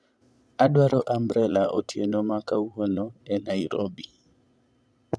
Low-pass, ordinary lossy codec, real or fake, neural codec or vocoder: none; none; fake; vocoder, 22.05 kHz, 80 mel bands, Vocos